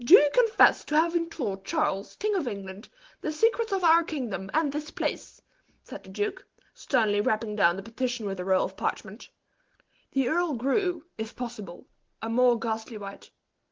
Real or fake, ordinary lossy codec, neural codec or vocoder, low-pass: real; Opus, 16 kbps; none; 7.2 kHz